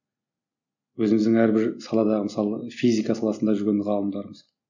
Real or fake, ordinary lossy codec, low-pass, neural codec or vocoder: real; none; 7.2 kHz; none